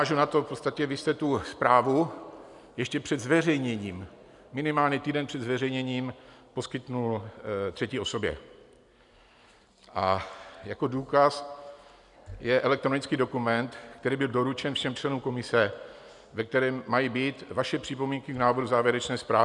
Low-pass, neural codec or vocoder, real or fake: 10.8 kHz; none; real